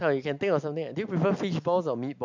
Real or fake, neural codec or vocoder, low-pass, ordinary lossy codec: real; none; 7.2 kHz; MP3, 64 kbps